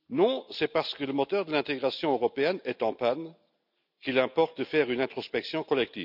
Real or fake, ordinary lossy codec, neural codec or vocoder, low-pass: real; none; none; 5.4 kHz